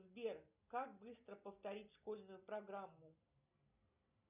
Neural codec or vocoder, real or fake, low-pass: none; real; 3.6 kHz